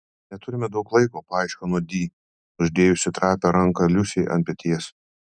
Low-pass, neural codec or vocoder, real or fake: 9.9 kHz; none; real